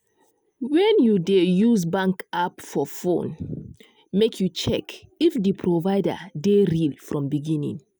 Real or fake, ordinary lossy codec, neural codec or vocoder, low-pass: real; none; none; none